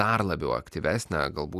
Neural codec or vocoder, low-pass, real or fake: none; 14.4 kHz; real